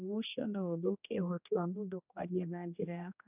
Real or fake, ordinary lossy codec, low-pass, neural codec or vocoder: fake; none; 3.6 kHz; codec, 16 kHz, 1 kbps, X-Codec, HuBERT features, trained on general audio